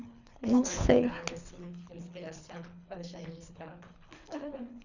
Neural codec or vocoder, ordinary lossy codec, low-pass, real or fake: codec, 24 kHz, 3 kbps, HILCodec; Opus, 64 kbps; 7.2 kHz; fake